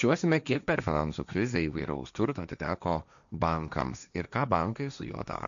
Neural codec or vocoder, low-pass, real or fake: codec, 16 kHz, 1.1 kbps, Voila-Tokenizer; 7.2 kHz; fake